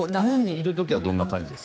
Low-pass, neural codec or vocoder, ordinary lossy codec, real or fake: none; codec, 16 kHz, 2 kbps, X-Codec, HuBERT features, trained on general audio; none; fake